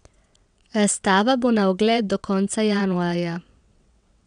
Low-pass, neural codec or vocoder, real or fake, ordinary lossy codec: 9.9 kHz; vocoder, 22.05 kHz, 80 mel bands, WaveNeXt; fake; none